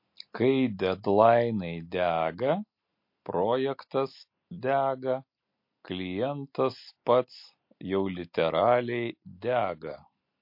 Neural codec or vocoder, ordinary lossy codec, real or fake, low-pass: none; MP3, 32 kbps; real; 5.4 kHz